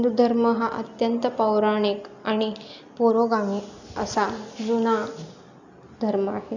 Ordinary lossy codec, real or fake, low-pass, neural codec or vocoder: none; real; 7.2 kHz; none